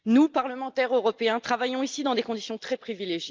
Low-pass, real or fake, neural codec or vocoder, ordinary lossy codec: 7.2 kHz; real; none; Opus, 24 kbps